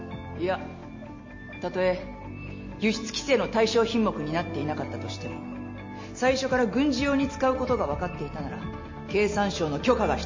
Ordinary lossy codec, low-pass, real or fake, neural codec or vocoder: MP3, 32 kbps; 7.2 kHz; real; none